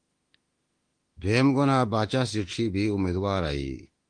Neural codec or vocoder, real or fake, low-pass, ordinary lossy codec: autoencoder, 48 kHz, 32 numbers a frame, DAC-VAE, trained on Japanese speech; fake; 9.9 kHz; Opus, 24 kbps